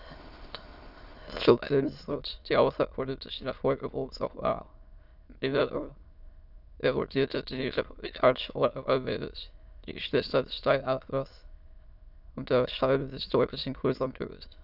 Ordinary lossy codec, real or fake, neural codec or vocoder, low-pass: none; fake; autoencoder, 22.05 kHz, a latent of 192 numbers a frame, VITS, trained on many speakers; 5.4 kHz